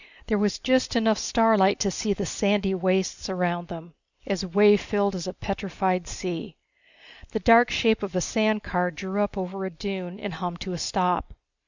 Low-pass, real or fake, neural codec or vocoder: 7.2 kHz; real; none